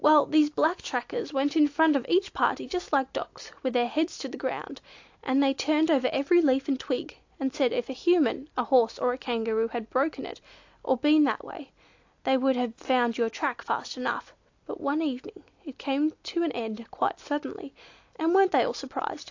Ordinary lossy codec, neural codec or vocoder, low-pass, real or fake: AAC, 48 kbps; none; 7.2 kHz; real